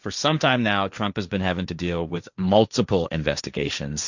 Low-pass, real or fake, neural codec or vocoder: 7.2 kHz; fake; codec, 16 kHz, 1.1 kbps, Voila-Tokenizer